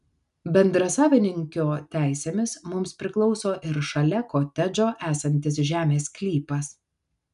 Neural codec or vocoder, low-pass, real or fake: none; 10.8 kHz; real